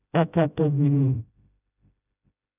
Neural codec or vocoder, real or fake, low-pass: codec, 16 kHz, 0.5 kbps, FreqCodec, smaller model; fake; 3.6 kHz